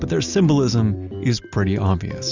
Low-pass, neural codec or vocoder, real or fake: 7.2 kHz; none; real